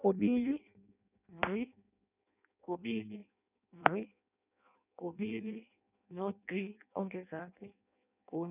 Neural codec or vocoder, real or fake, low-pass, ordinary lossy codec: codec, 16 kHz in and 24 kHz out, 0.6 kbps, FireRedTTS-2 codec; fake; 3.6 kHz; none